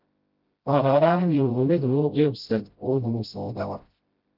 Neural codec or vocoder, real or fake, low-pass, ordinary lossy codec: codec, 16 kHz, 0.5 kbps, FreqCodec, smaller model; fake; 5.4 kHz; Opus, 32 kbps